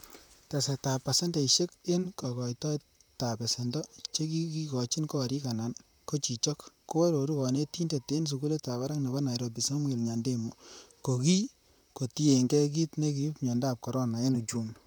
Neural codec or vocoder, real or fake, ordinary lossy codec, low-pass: vocoder, 44.1 kHz, 128 mel bands, Pupu-Vocoder; fake; none; none